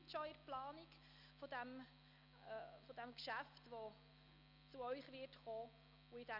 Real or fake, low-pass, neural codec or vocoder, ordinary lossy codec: real; 5.4 kHz; none; none